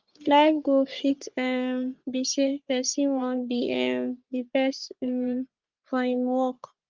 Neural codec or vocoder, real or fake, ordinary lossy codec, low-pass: codec, 44.1 kHz, 3.4 kbps, Pupu-Codec; fake; Opus, 32 kbps; 7.2 kHz